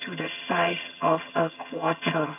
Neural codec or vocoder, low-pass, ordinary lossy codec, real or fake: vocoder, 22.05 kHz, 80 mel bands, HiFi-GAN; 3.6 kHz; none; fake